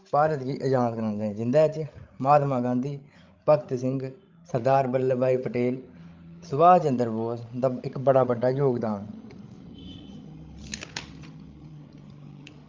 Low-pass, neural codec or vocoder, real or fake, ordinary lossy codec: 7.2 kHz; codec, 16 kHz, 16 kbps, FreqCodec, larger model; fake; Opus, 32 kbps